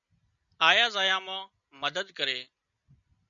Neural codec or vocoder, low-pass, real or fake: none; 7.2 kHz; real